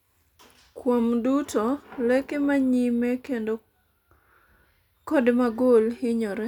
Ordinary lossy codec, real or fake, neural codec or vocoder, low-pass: none; real; none; 19.8 kHz